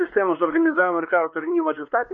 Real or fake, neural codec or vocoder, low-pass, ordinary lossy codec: fake; codec, 16 kHz, 2 kbps, X-Codec, WavLM features, trained on Multilingual LibriSpeech; 7.2 kHz; MP3, 48 kbps